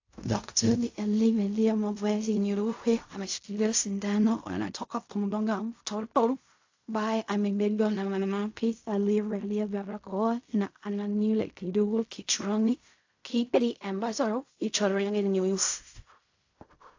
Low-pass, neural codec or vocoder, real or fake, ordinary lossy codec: 7.2 kHz; codec, 16 kHz in and 24 kHz out, 0.4 kbps, LongCat-Audio-Codec, fine tuned four codebook decoder; fake; AAC, 48 kbps